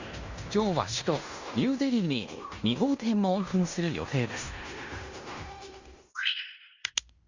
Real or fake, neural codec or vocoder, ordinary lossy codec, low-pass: fake; codec, 16 kHz in and 24 kHz out, 0.9 kbps, LongCat-Audio-Codec, fine tuned four codebook decoder; Opus, 64 kbps; 7.2 kHz